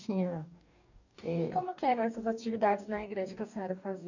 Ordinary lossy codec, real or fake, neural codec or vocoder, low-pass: none; fake; codec, 44.1 kHz, 2.6 kbps, DAC; 7.2 kHz